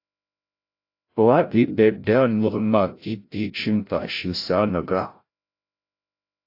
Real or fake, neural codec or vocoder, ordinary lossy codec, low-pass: fake; codec, 16 kHz, 0.5 kbps, FreqCodec, larger model; AAC, 32 kbps; 5.4 kHz